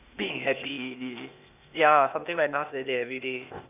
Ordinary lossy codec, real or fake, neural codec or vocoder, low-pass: none; fake; codec, 16 kHz, 0.8 kbps, ZipCodec; 3.6 kHz